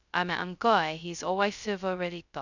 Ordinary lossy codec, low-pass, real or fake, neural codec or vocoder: none; 7.2 kHz; fake; codec, 16 kHz, 0.2 kbps, FocalCodec